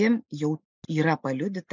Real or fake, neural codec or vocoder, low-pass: real; none; 7.2 kHz